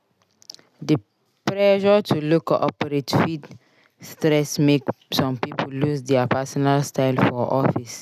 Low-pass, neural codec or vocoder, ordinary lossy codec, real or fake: 14.4 kHz; none; none; real